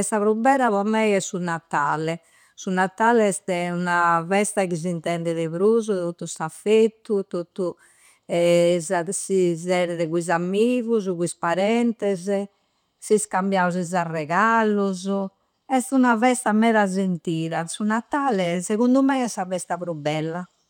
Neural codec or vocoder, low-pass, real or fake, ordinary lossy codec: none; 19.8 kHz; real; none